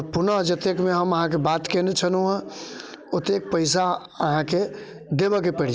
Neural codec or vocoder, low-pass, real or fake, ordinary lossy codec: none; none; real; none